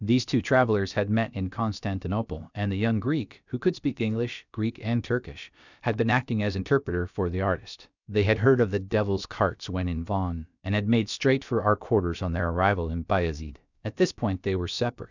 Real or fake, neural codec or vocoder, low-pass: fake; codec, 16 kHz, about 1 kbps, DyCAST, with the encoder's durations; 7.2 kHz